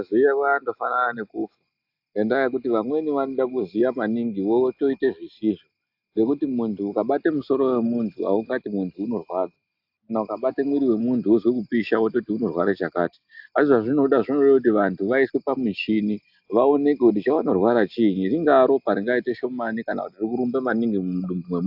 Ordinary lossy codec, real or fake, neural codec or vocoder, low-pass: AAC, 48 kbps; real; none; 5.4 kHz